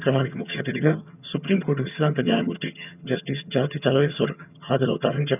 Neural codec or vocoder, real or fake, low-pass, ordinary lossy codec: vocoder, 22.05 kHz, 80 mel bands, HiFi-GAN; fake; 3.6 kHz; none